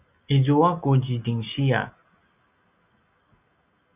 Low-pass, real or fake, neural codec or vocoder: 3.6 kHz; real; none